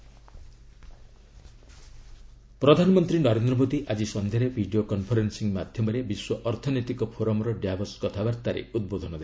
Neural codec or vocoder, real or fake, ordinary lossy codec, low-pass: none; real; none; none